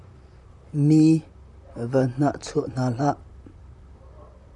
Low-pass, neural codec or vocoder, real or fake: 10.8 kHz; vocoder, 44.1 kHz, 128 mel bands, Pupu-Vocoder; fake